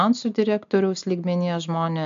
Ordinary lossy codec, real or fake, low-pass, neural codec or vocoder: MP3, 64 kbps; real; 7.2 kHz; none